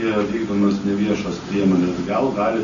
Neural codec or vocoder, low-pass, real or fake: none; 7.2 kHz; real